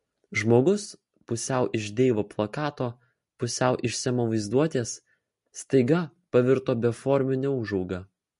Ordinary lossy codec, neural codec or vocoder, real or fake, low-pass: MP3, 48 kbps; none; real; 14.4 kHz